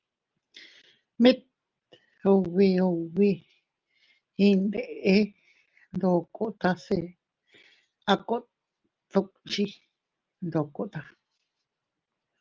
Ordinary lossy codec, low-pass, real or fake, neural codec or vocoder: Opus, 32 kbps; 7.2 kHz; real; none